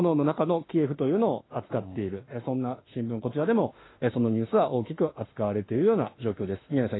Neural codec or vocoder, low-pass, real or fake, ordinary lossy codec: autoencoder, 48 kHz, 32 numbers a frame, DAC-VAE, trained on Japanese speech; 7.2 kHz; fake; AAC, 16 kbps